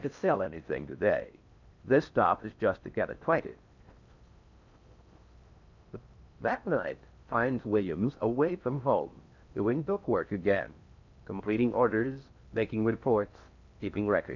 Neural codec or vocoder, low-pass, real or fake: codec, 16 kHz in and 24 kHz out, 0.6 kbps, FocalCodec, streaming, 4096 codes; 7.2 kHz; fake